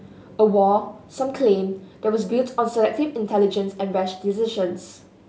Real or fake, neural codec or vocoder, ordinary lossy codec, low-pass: real; none; none; none